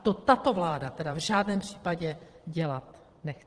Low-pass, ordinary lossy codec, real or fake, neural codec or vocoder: 9.9 kHz; Opus, 16 kbps; fake; vocoder, 22.05 kHz, 80 mel bands, Vocos